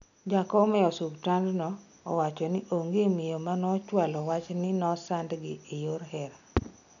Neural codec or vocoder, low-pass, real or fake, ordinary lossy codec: none; 7.2 kHz; real; none